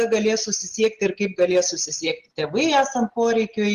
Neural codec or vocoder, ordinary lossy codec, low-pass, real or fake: none; Opus, 16 kbps; 14.4 kHz; real